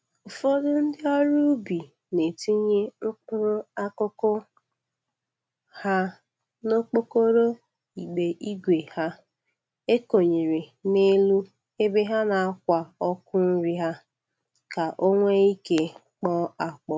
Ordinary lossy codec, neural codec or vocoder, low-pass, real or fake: none; none; none; real